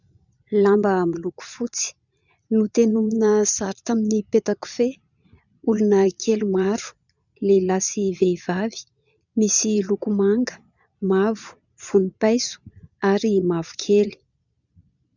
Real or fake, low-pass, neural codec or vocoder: fake; 7.2 kHz; vocoder, 22.05 kHz, 80 mel bands, Vocos